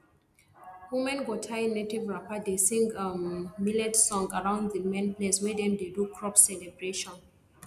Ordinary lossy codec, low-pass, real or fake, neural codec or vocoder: none; 14.4 kHz; real; none